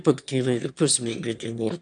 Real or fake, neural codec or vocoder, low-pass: fake; autoencoder, 22.05 kHz, a latent of 192 numbers a frame, VITS, trained on one speaker; 9.9 kHz